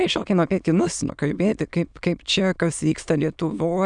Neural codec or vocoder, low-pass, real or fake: autoencoder, 22.05 kHz, a latent of 192 numbers a frame, VITS, trained on many speakers; 9.9 kHz; fake